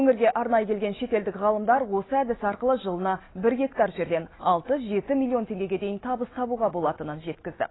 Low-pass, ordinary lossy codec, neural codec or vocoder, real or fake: 7.2 kHz; AAC, 16 kbps; none; real